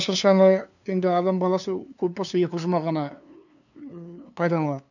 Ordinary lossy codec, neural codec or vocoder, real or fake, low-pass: none; codec, 16 kHz, 2 kbps, FunCodec, trained on LibriTTS, 25 frames a second; fake; 7.2 kHz